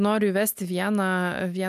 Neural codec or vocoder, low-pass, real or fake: none; 14.4 kHz; real